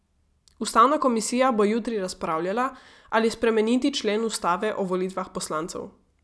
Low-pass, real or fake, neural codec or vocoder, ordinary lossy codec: none; real; none; none